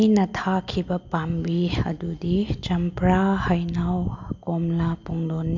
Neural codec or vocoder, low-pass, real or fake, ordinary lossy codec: none; 7.2 kHz; real; MP3, 64 kbps